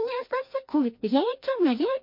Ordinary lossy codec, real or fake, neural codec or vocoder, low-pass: MP3, 32 kbps; fake; codec, 16 kHz, 1 kbps, FreqCodec, larger model; 5.4 kHz